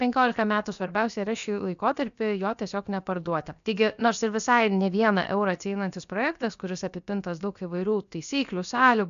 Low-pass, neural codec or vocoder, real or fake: 7.2 kHz; codec, 16 kHz, about 1 kbps, DyCAST, with the encoder's durations; fake